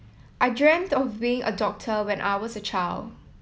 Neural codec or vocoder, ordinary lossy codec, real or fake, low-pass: none; none; real; none